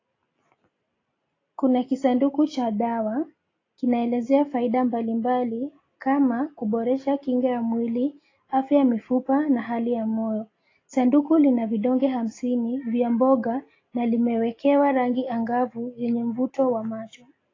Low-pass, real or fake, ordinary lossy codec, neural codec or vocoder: 7.2 kHz; real; AAC, 32 kbps; none